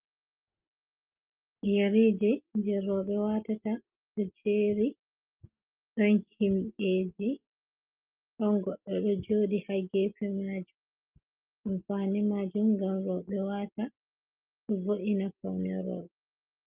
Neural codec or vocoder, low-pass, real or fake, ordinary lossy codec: none; 3.6 kHz; real; Opus, 32 kbps